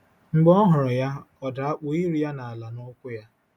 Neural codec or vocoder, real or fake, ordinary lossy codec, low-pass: none; real; none; 19.8 kHz